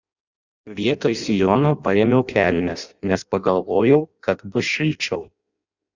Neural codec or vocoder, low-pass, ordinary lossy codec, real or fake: codec, 16 kHz in and 24 kHz out, 0.6 kbps, FireRedTTS-2 codec; 7.2 kHz; Opus, 64 kbps; fake